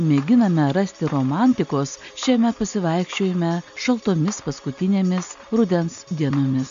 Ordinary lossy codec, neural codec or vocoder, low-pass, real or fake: MP3, 64 kbps; none; 7.2 kHz; real